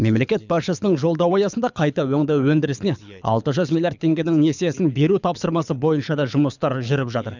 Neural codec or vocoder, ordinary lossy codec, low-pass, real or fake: codec, 24 kHz, 6 kbps, HILCodec; none; 7.2 kHz; fake